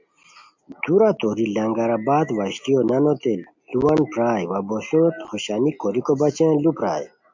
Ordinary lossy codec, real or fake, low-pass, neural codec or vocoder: MP3, 64 kbps; real; 7.2 kHz; none